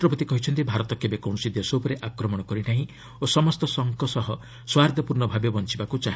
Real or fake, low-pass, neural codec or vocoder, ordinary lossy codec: real; none; none; none